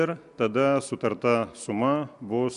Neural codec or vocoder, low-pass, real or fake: none; 10.8 kHz; real